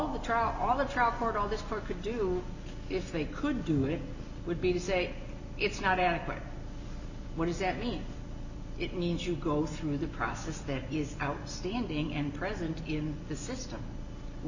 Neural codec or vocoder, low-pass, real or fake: none; 7.2 kHz; real